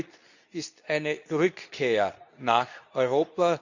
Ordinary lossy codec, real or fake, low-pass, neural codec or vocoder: none; fake; 7.2 kHz; codec, 24 kHz, 0.9 kbps, WavTokenizer, medium speech release version 2